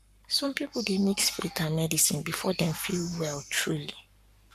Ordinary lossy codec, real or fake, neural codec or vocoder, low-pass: none; fake; codec, 44.1 kHz, 7.8 kbps, Pupu-Codec; 14.4 kHz